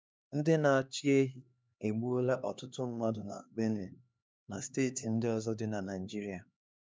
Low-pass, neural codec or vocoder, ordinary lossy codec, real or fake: none; codec, 16 kHz, 2 kbps, X-Codec, HuBERT features, trained on LibriSpeech; none; fake